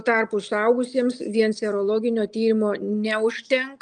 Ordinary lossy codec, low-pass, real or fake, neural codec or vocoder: Opus, 32 kbps; 9.9 kHz; real; none